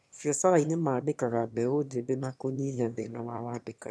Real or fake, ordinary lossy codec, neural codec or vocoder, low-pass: fake; none; autoencoder, 22.05 kHz, a latent of 192 numbers a frame, VITS, trained on one speaker; none